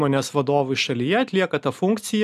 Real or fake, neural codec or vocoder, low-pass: real; none; 14.4 kHz